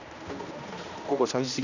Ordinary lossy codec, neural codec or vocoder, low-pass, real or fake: none; codec, 16 kHz, 1 kbps, X-Codec, HuBERT features, trained on general audio; 7.2 kHz; fake